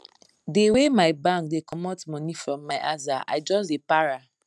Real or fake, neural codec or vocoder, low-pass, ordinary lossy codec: real; none; none; none